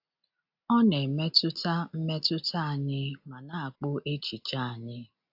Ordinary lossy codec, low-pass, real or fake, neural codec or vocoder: AAC, 48 kbps; 5.4 kHz; real; none